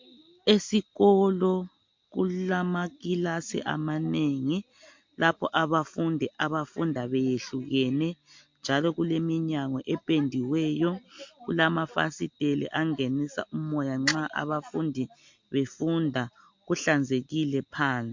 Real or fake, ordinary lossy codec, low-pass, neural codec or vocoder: real; MP3, 48 kbps; 7.2 kHz; none